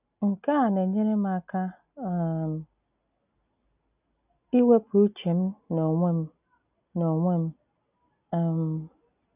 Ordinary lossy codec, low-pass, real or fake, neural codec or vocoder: none; 3.6 kHz; real; none